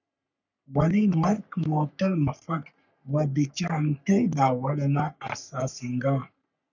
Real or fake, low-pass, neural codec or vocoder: fake; 7.2 kHz; codec, 44.1 kHz, 3.4 kbps, Pupu-Codec